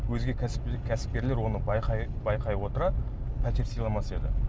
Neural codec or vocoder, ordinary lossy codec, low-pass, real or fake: none; none; none; real